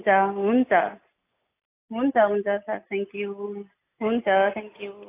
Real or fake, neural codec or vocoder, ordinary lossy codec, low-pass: real; none; AAC, 24 kbps; 3.6 kHz